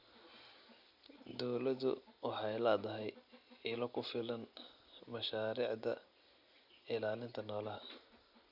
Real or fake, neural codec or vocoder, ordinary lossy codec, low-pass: real; none; Opus, 64 kbps; 5.4 kHz